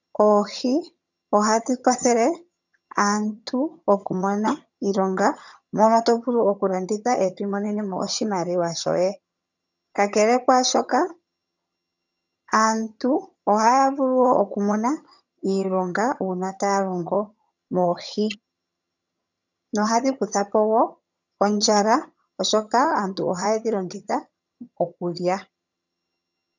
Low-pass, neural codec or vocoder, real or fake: 7.2 kHz; vocoder, 22.05 kHz, 80 mel bands, HiFi-GAN; fake